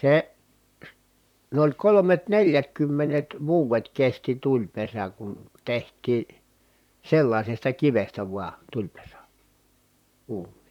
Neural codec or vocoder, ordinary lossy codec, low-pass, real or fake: vocoder, 44.1 kHz, 128 mel bands, Pupu-Vocoder; none; 19.8 kHz; fake